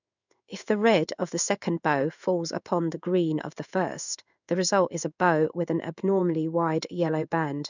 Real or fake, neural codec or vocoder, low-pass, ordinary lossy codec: fake; codec, 16 kHz in and 24 kHz out, 1 kbps, XY-Tokenizer; 7.2 kHz; none